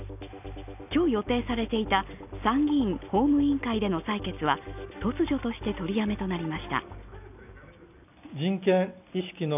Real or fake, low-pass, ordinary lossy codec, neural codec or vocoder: real; 3.6 kHz; none; none